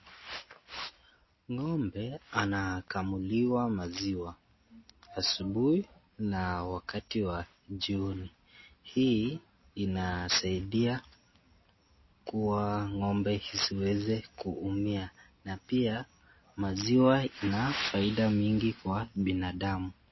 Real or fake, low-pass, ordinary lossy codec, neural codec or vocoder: real; 7.2 kHz; MP3, 24 kbps; none